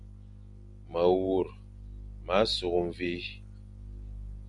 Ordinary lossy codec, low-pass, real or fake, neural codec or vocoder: Opus, 64 kbps; 10.8 kHz; real; none